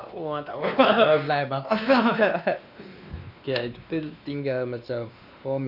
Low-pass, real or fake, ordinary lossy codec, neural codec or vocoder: 5.4 kHz; fake; none; codec, 16 kHz, 2 kbps, X-Codec, WavLM features, trained on Multilingual LibriSpeech